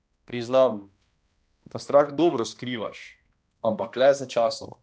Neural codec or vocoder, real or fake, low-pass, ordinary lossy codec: codec, 16 kHz, 1 kbps, X-Codec, HuBERT features, trained on balanced general audio; fake; none; none